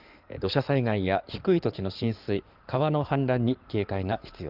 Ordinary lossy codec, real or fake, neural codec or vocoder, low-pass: Opus, 32 kbps; fake; codec, 16 kHz in and 24 kHz out, 2.2 kbps, FireRedTTS-2 codec; 5.4 kHz